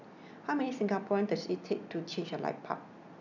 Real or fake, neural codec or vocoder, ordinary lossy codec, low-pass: real; none; none; 7.2 kHz